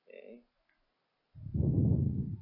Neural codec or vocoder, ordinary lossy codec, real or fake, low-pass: none; none; real; 5.4 kHz